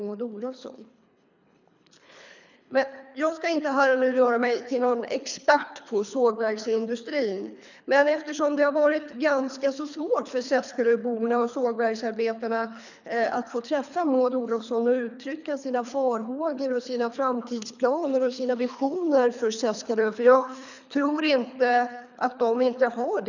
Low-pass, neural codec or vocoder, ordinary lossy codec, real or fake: 7.2 kHz; codec, 24 kHz, 3 kbps, HILCodec; none; fake